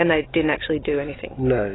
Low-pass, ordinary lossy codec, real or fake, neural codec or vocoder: 7.2 kHz; AAC, 16 kbps; real; none